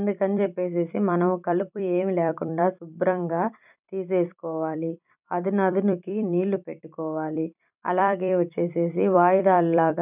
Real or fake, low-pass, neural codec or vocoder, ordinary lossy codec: fake; 3.6 kHz; vocoder, 44.1 kHz, 128 mel bands every 256 samples, BigVGAN v2; none